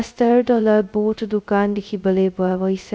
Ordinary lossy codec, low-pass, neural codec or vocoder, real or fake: none; none; codec, 16 kHz, 0.2 kbps, FocalCodec; fake